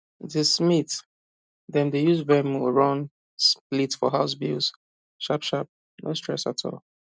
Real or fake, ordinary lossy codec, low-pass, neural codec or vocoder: real; none; none; none